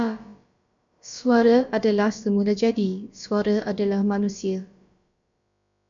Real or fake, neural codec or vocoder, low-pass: fake; codec, 16 kHz, about 1 kbps, DyCAST, with the encoder's durations; 7.2 kHz